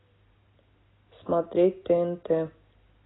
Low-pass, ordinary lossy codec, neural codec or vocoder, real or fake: 7.2 kHz; AAC, 16 kbps; none; real